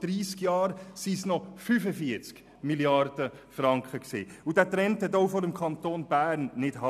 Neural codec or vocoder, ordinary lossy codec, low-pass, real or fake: none; none; 14.4 kHz; real